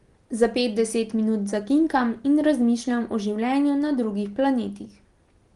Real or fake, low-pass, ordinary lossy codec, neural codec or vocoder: real; 10.8 kHz; Opus, 24 kbps; none